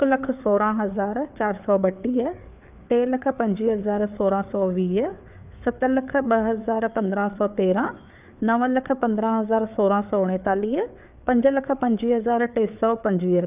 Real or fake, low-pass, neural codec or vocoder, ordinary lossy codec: fake; 3.6 kHz; codec, 16 kHz, 4 kbps, FreqCodec, larger model; none